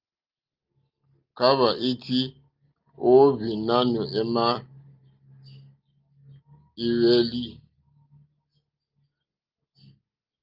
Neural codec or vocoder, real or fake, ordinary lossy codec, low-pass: none; real; Opus, 24 kbps; 5.4 kHz